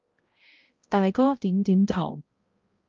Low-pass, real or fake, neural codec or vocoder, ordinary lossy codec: 7.2 kHz; fake; codec, 16 kHz, 0.5 kbps, X-Codec, HuBERT features, trained on balanced general audio; Opus, 32 kbps